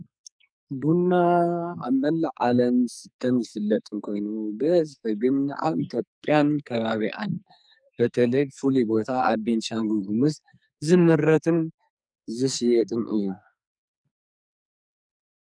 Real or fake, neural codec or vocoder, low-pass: fake; codec, 44.1 kHz, 2.6 kbps, SNAC; 9.9 kHz